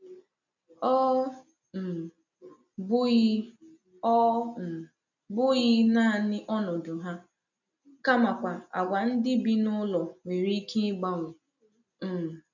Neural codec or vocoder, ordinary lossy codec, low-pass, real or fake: none; none; 7.2 kHz; real